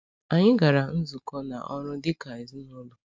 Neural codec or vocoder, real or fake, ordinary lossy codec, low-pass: none; real; none; none